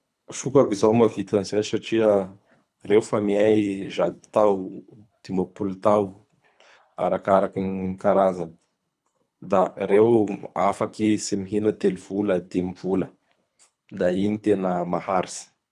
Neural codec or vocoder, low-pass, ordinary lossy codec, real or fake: codec, 24 kHz, 3 kbps, HILCodec; none; none; fake